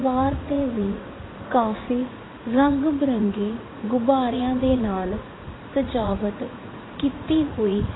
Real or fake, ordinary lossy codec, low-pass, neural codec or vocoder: fake; AAC, 16 kbps; 7.2 kHz; vocoder, 44.1 kHz, 80 mel bands, Vocos